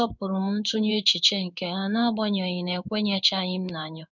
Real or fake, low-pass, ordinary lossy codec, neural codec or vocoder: fake; 7.2 kHz; none; codec, 16 kHz in and 24 kHz out, 1 kbps, XY-Tokenizer